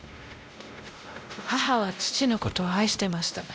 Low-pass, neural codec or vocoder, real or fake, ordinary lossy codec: none; codec, 16 kHz, 0.5 kbps, X-Codec, WavLM features, trained on Multilingual LibriSpeech; fake; none